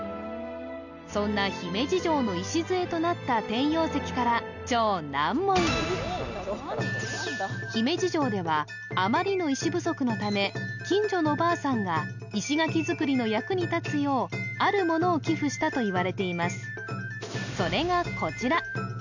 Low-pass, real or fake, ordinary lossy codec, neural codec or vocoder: 7.2 kHz; real; none; none